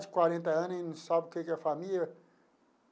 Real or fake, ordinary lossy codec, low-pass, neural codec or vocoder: real; none; none; none